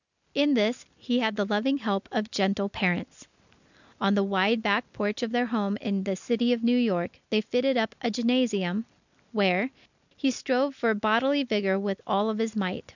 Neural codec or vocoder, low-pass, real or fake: none; 7.2 kHz; real